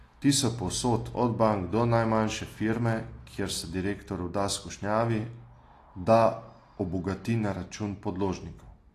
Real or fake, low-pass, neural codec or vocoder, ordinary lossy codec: real; 14.4 kHz; none; AAC, 48 kbps